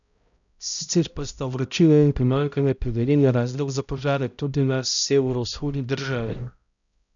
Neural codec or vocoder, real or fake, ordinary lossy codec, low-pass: codec, 16 kHz, 0.5 kbps, X-Codec, HuBERT features, trained on balanced general audio; fake; none; 7.2 kHz